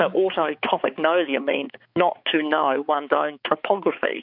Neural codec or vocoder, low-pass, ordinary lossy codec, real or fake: codec, 16 kHz, 4 kbps, X-Codec, HuBERT features, trained on balanced general audio; 5.4 kHz; MP3, 48 kbps; fake